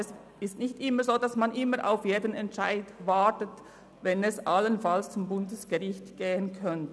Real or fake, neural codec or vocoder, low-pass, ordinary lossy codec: real; none; none; none